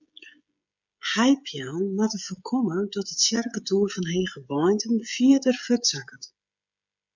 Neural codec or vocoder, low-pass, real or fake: codec, 16 kHz, 16 kbps, FreqCodec, smaller model; 7.2 kHz; fake